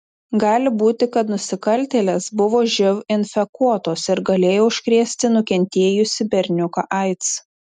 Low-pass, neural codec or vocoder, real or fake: 10.8 kHz; none; real